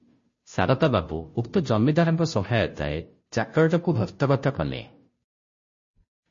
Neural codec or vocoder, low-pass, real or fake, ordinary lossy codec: codec, 16 kHz, 0.5 kbps, FunCodec, trained on Chinese and English, 25 frames a second; 7.2 kHz; fake; MP3, 32 kbps